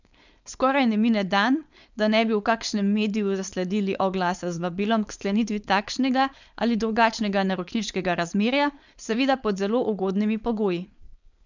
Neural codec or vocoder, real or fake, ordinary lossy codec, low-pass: codec, 16 kHz, 4.8 kbps, FACodec; fake; none; 7.2 kHz